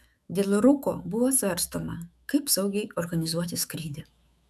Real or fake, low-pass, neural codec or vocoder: fake; 14.4 kHz; autoencoder, 48 kHz, 128 numbers a frame, DAC-VAE, trained on Japanese speech